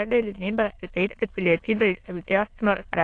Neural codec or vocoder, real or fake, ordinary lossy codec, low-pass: autoencoder, 22.05 kHz, a latent of 192 numbers a frame, VITS, trained on many speakers; fake; AAC, 48 kbps; 9.9 kHz